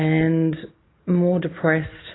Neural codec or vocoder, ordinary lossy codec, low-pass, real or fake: none; AAC, 16 kbps; 7.2 kHz; real